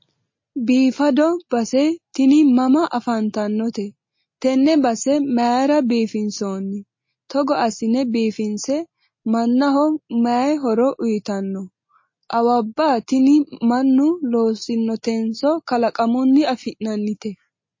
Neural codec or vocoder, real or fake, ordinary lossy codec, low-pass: none; real; MP3, 32 kbps; 7.2 kHz